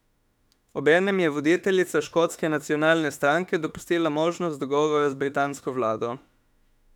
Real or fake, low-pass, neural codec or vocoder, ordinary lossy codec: fake; 19.8 kHz; autoencoder, 48 kHz, 32 numbers a frame, DAC-VAE, trained on Japanese speech; none